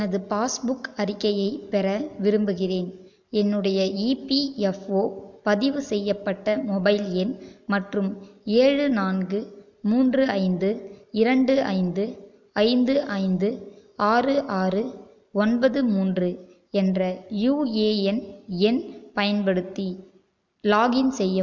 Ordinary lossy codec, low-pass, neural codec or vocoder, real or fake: none; 7.2 kHz; none; real